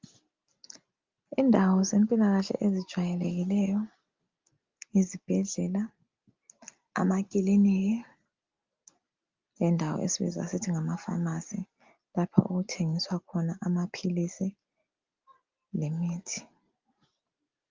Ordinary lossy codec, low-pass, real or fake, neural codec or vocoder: Opus, 24 kbps; 7.2 kHz; real; none